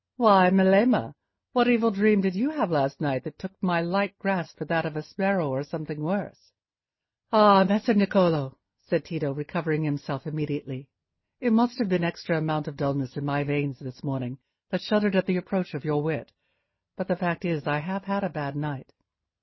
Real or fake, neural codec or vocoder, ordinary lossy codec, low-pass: real; none; MP3, 24 kbps; 7.2 kHz